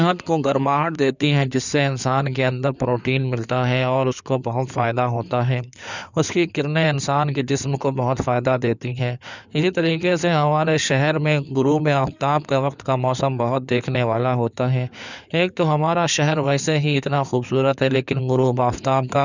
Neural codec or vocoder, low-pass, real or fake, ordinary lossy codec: codec, 16 kHz in and 24 kHz out, 2.2 kbps, FireRedTTS-2 codec; 7.2 kHz; fake; none